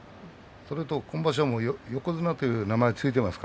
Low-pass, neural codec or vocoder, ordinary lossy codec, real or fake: none; none; none; real